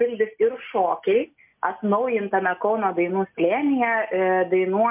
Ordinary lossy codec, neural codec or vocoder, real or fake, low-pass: MP3, 32 kbps; none; real; 3.6 kHz